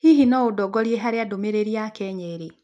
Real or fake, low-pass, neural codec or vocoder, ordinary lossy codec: real; none; none; none